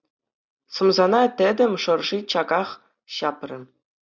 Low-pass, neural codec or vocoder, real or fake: 7.2 kHz; none; real